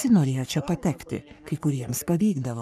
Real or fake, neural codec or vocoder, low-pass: fake; codec, 44.1 kHz, 3.4 kbps, Pupu-Codec; 14.4 kHz